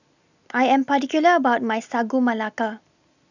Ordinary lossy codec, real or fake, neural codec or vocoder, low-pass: none; real; none; 7.2 kHz